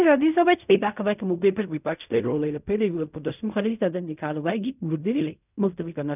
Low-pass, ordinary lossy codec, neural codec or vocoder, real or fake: 3.6 kHz; none; codec, 16 kHz in and 24 kHz out, 0.4 kbps, LongCat-Audio-Codec, fine tuned four codebook decoder; fake